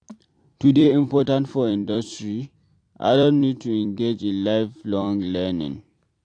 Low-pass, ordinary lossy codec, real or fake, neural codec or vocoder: 9.9 kHz; AAC, 48 kbps; fake; vocoder, 44.1 kHz, 128 mel bands every 256 samples, BigVGAN v2